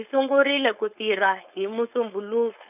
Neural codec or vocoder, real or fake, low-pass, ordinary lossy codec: codec, 16 kHz, 4.8 kbps, FACodec; fake; 3.6 kHz; none